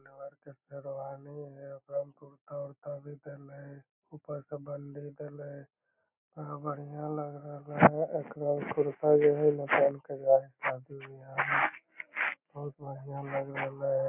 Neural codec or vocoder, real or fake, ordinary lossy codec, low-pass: none; real; none; 3.6 kHz